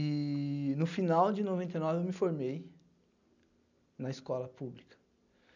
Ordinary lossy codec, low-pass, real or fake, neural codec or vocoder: none; 7.2 kHz; real; none